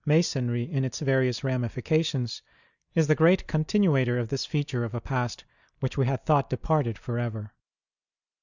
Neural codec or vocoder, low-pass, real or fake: none; 7.2 kHz; real